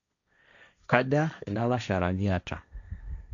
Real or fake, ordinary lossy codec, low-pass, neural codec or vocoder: fake; none; 7.2 kHz; codec, 16 kHz, 1.1 kbps, Voila-Tokenizer